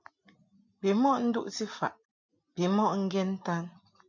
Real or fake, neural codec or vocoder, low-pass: real; none; 7.2 kHz